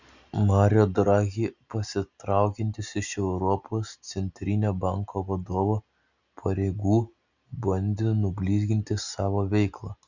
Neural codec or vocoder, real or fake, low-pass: none; real; 7.2 kHz